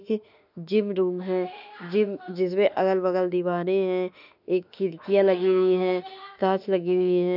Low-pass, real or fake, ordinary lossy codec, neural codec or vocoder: 5.4 kHz; fake; none; autoencoder, 48 kHz, 32 numbers a frame, DAC-VAE, trained on Japanese speech